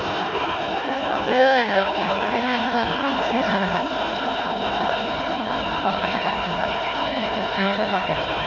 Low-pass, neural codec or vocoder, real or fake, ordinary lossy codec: 7.2 kHz; codec, 16 kHz, 1 kbps, FunCodec, trained on Chinese and English, 50 frames a second; fake; none